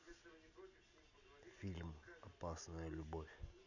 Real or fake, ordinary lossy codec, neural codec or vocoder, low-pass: real; none; none; 7.2 kHz